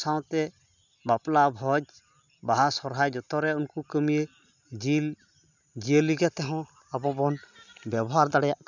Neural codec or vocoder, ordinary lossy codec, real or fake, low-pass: none; none; real; 7.2 kHz